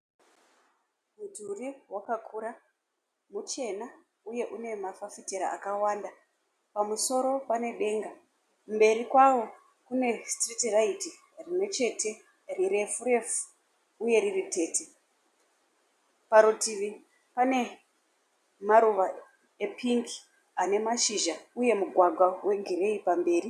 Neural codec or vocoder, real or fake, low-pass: none; real; 14.4 kHz